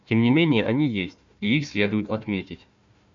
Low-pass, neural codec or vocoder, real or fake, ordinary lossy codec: 7.2 kHz; codec, 16 kHz, 1 kbps, FunCodec, trained on Chinese and English, 50 frames a second; fake; AAC, 64 kbps